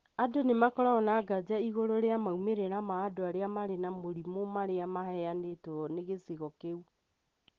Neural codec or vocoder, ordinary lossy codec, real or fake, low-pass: none; Opus, 16 kbps; real; 7.2 kHz